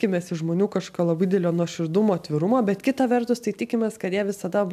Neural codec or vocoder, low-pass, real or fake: none; 14.4 kHz; real